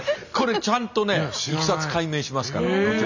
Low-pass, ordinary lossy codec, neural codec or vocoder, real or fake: 7.2 kHz; none; none; real